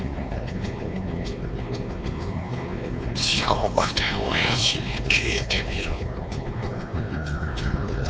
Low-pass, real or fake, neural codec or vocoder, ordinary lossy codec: none; fake; codec, 16 kHz, 2 kbps, X-Codec, WavLM features, trained on Multilingual LibriSpeech; none